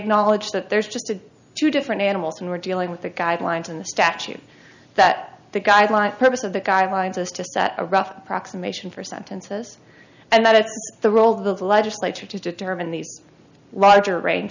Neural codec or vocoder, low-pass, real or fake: none; 7.2 kHz; real